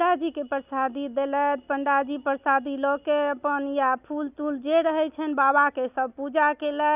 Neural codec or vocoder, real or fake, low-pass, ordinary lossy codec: none; real; 3.6 kHz; none